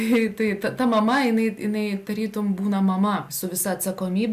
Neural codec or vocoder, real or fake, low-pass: none; real; 14.4 kHz